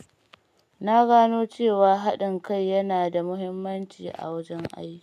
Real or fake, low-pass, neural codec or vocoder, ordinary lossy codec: real; 14.4 kHz; none; none